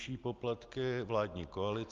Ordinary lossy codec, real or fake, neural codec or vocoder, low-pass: Opus, 32 kbps; real; none; 7.2 kHz